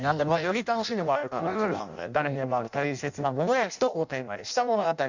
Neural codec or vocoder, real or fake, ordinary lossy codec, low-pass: codec, 16 kHz in and 24 kHz out, 0.6 kbps, FireRedTTS-2 codec; fake; none; 7.2 kHz